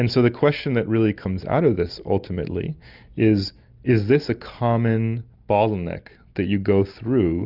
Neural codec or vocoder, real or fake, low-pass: none; real; 5.4 kHz